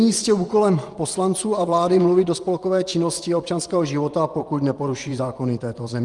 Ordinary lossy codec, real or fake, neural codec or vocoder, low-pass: Opus, 32 kbps; fake; vocoder, 24 kHz, 100 mel bands, Vocos; 10.8 kHz